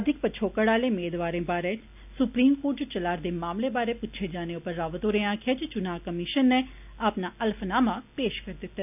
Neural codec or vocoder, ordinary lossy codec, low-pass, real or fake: none; none; 3.6 kHz; real